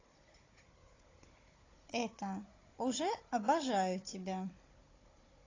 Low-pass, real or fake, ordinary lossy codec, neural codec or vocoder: 7.2 kHz; fake; AAC, 32 kbps; codec, 16 kHz, 16 kbps, FunCodec, trained on Chinese and English, 50 frames a second